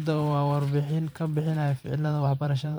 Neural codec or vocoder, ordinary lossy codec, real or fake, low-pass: vocoder, 44.1 kHz, 128 mel bands every 512 samples, BigVGAN v2; none; fake; none